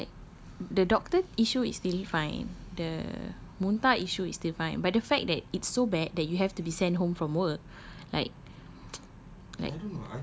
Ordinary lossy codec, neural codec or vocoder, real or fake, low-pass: none; none; real; none